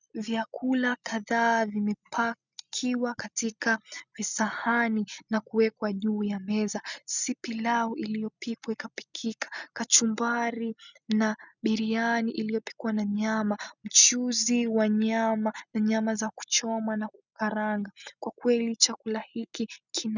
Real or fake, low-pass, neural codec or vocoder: real; 7.2 kHz; none